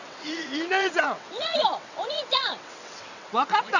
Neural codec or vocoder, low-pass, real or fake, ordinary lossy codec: vocoder, 22.05 kHz, 80 mel bands, WaveNeXt; 7.2 kHz; fake; none